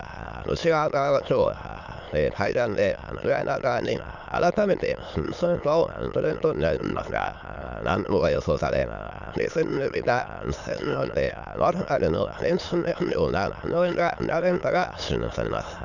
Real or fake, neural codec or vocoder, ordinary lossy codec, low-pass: fake; autoencoder, 22.05 kHz, a latent of 192 numbers a frame, VITS, trained on many speakers; none; 7.2 kHz